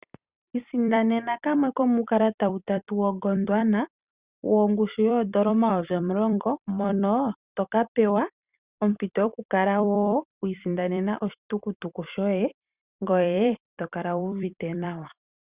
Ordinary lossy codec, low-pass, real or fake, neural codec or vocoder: Opus, 64 kbps; 3.6 kHz; fake; vocoder, 44.1 kHz, 128 mel bands every 256 samples, BigVGAN v2